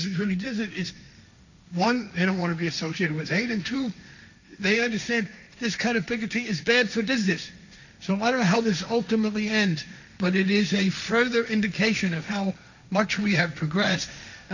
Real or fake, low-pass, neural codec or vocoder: fake; 7.2 kHz; codec, 16 kHz, 1.1 kbps, Voila-Tokenizer